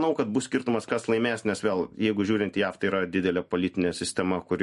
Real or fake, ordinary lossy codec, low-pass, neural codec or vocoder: real; MP3, 48 kbps; 14.4 kHz; none